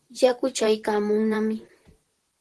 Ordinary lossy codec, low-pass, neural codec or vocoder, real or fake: Opus, 16 kbps; 10.8 kHz; vocoder, 44.1 kHz, 128 mel bands, Pupu-Vocoder; fake